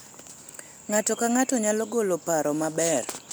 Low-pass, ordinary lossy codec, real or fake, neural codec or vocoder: none; none; real; none